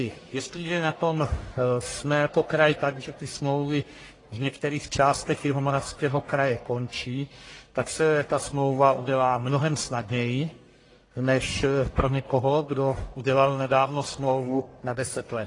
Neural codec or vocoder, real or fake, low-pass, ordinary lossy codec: codec, 44.1 kHz, 1.7 kbps, Pupu-Codec; fake; 10.8 kHz; AAC, 32 kbps